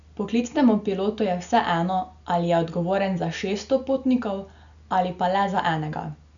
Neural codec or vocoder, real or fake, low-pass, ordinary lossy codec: none; real; 7.2 kHz; none